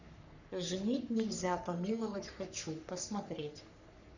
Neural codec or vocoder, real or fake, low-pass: codec, 44.1 kHz, 3.4 kbps, Pupu-Codec; fake; 7.2 kHz